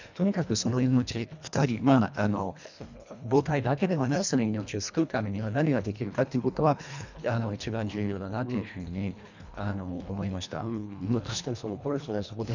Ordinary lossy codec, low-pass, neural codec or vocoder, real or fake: none; 7.2 kHz; codec, 24 kHz, 1.5 kbps, HILCodec; fake